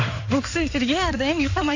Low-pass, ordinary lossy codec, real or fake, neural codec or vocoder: 7.2 kHz; AAC, 48 kbps; fake; codec, 16 kHz in and 24 kHz out, 2.2 kbps, FireRedTTS-2 codec